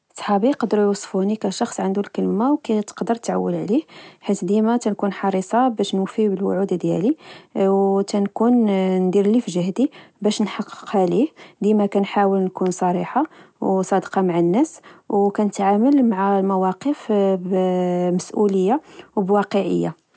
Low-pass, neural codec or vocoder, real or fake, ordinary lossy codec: none; none; real; none